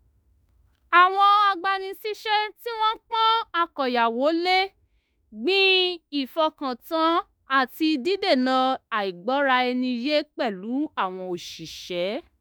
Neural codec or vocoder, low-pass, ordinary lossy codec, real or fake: autoencoder, 48 kHz, 32 numbers a frame, DAC-VAE, trained on Japanese speech; none; none; fake